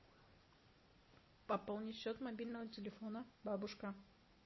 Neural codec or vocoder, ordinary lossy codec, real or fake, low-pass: none; MP3, 24 kbps; real; 7.2 kHz